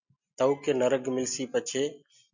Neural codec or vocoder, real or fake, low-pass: none; real; 7.2 kHz